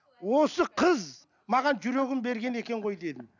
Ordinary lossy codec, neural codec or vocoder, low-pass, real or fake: none; none; 7.2 kHz; real